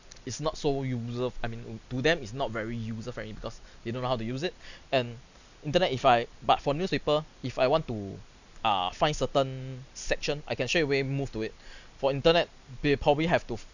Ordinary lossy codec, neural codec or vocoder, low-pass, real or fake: none; none; 7.2 kHz; real